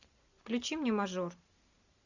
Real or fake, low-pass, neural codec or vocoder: real; 7.2 kHz; none